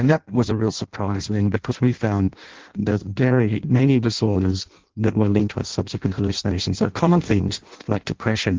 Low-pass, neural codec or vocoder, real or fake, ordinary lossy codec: 7.2 kHz; codec, 16 kHz in and 24 kHz out, 0.6 kbps, FireRedTTS-2 codec; fake; Opus, 16 kbps